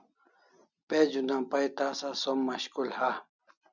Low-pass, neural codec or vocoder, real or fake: 7.2 kHz; none; real